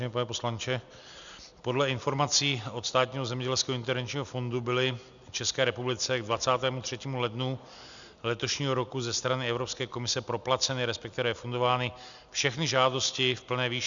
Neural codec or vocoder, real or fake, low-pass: none; real; 7.2 kHz